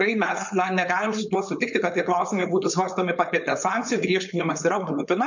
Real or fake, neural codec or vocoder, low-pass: fake; codec, 16 kHz, 4.8 kbps, FACodec; 7.2 kHz